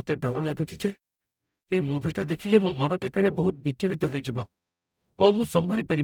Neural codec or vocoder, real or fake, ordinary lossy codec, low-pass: codec, 44.1 kHz, 0.9 kbps, DAC; fake; none; 19.8 kHz